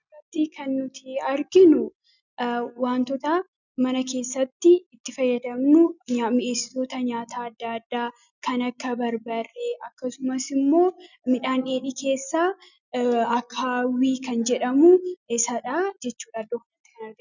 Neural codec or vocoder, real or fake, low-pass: none; real; 7.2 kHz